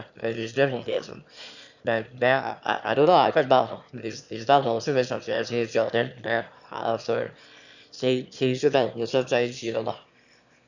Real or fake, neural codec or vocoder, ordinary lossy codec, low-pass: fake; autoencoder, 22.05 kHz, a latent of 192 numbers a frame, VITS, trained on one speaker; none; 7.2 kHz